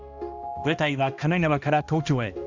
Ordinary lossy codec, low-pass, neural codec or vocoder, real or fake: Opus, 64 kbps; 7.2 kHz; codec, 16 kHz, 1 kbps, X-Codec, HuBERT features, trained on balanced general audio; fake